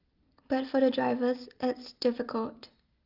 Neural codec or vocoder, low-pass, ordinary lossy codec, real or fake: none; 5.4 kHz; Opus, 32 kbps; real